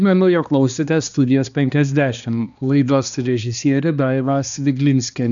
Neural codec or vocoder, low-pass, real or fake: codec, 16 kHz, 2 kbps, X-Codec, HuBERT features, trained on balanced general audio; 7.2 kHz; fake